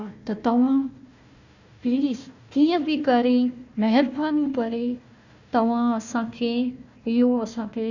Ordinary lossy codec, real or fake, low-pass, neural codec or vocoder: none; fake; 7.2 kHz; codec, 16 kHz, 1 kbps, FunCodec, trained on Chinese and English, 50 frames a second